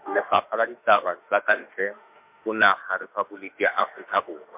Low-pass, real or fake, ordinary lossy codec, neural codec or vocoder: 3.6 kHz; fake; MP3, 32 kbps; autoencoder, 48 kHz, 32 numbers a frame, DAC-VAE, trained on Japanese speech